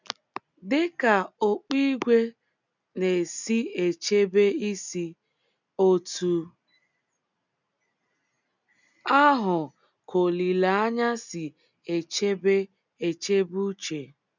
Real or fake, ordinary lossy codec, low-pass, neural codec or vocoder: real; none; 7.2 kHz; none